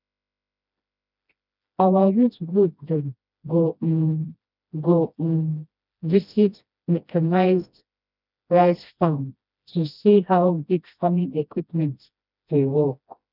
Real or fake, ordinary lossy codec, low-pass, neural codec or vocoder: fake; none; 5.4 kHz; codec, 16 kHz, 1 kbps, FreqCodec, smaller model